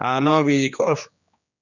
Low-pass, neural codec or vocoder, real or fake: 7.2 kHz; codec, 16 kHz, 2 kbps, X-Codec, HuBERT features, trained on general audio; fake